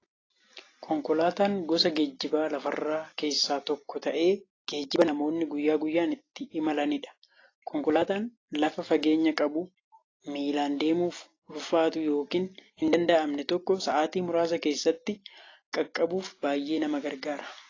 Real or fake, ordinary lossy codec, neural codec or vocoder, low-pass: real; AAC, 32 kbps; none; 7.2 kHz